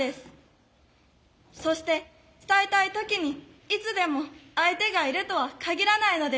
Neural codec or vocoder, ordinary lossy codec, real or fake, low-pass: none; none; real; none